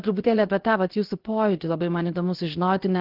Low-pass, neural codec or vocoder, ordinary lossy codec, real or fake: 5.4 kHz; codec, 16 kHz, 0.7 kbps, FocalCodec; Opus, 16 kbps; fake